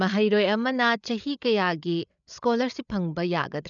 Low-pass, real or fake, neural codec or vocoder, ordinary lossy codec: 7.2 kHz; fake; codec, 16 kHz, 8 kbps, FreqCodec, larger model; none